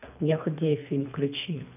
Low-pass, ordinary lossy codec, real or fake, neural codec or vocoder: 3.6 kHz; none; fake; codec, 24 kHz, 3 kbps, HILCodec